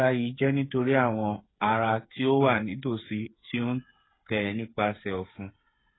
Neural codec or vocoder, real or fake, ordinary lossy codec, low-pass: codec, 16 kHz, 8 kbps, FreqCodec, smaller model; fake; AAC, 16 kbps; 7.2 kHz